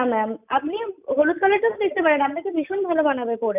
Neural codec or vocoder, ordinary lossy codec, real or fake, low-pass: none; none; real; 3.6 kHz